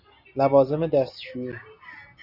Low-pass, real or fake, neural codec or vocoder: 5.4 kHz; real; none